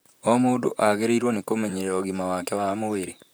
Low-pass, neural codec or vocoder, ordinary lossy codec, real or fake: none; vocoder, 44.1 kHz, 128 mel bands, Pupu-Vocoder; none; fake